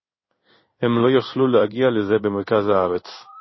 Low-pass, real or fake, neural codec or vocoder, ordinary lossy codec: 7.2 kHz; fake; codec, 16 kHz in and 24 kHz out, 1 kbps, XY-Tokenizer; MP3, 24 kbps